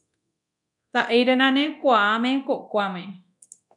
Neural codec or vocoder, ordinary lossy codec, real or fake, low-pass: codec, 24 kHz, 0.9 kbps, DualCodec; MP3, 96 kbps; fake; 10.8 kHz